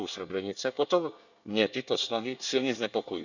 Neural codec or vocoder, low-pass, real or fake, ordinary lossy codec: codec, 24 kHz, 1 kbps, SNAC; 7.2 kHz; fake; none